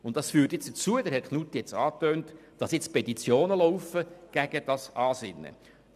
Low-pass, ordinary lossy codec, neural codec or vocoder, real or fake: 14.4 kHz; none; none; real